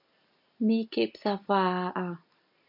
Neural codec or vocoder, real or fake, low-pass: none; real; 5.4 kHz